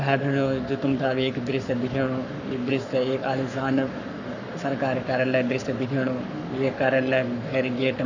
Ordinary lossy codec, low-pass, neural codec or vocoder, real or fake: none; 7.2 kHz; codec, 44.1 kHz, 7.8 kbps, Pupu-Codec; fake